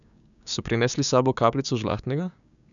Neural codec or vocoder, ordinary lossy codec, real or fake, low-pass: codec, 16 kHz, 6 kbps, DAC; none; fake; 7.2 kHz